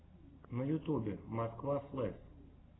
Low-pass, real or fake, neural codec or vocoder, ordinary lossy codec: 7.2 kHz; real; none; AAC, 16 kbps